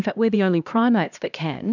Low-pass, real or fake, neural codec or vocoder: 7.2 kHz; fake; codec, 16 kHz, 1 kbps, X-Codec, HuBERT features, trained on LibriSpeech